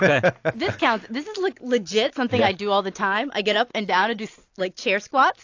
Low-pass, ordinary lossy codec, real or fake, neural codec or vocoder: 7.2 kHz; AAC, 48 kbps; real; none